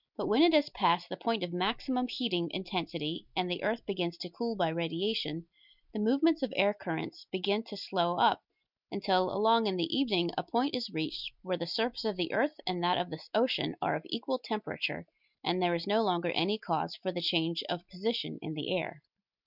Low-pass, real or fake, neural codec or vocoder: 5.4 kHz; real; none